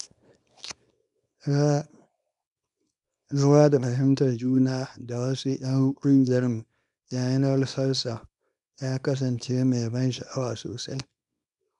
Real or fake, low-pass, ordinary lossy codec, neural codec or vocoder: fake; 10.8 kHz; none; codec, 24 kHz, 0.9 kbps, WavTokenizer, small release